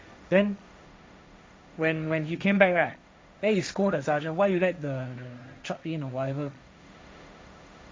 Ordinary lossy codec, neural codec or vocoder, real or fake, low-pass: none; codec, 16 kHz, 1.1 kbps, Voila-Tokenizer; fake; none